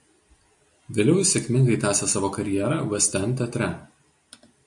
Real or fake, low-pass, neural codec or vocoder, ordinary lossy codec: real; 10.8 kHz; none; MP3, 48 kbps